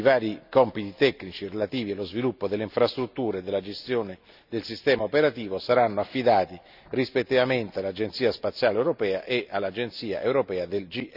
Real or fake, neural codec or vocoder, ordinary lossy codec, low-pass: real; none; none; 5.4 kHz